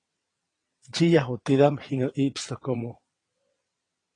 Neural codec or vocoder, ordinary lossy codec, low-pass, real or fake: vocoder, 22.05 kHz, 80 mel bands, WaveNeXt; AAC, 32 kbps; 9.9 kHz; fake